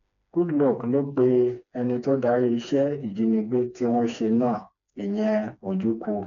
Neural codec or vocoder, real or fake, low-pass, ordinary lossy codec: codec, 16 kHz, 2 kbps, FreqCodec, smaller model; fake; 7.2 kHz; none